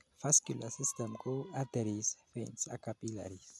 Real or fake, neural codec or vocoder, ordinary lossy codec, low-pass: real; none; none; none